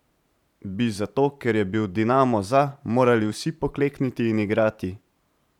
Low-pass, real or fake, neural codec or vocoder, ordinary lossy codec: 19.8 kHz; fake; vocoder, 44.1 kHz, 128 mel bands every 512 samples, BigVGAN v2; none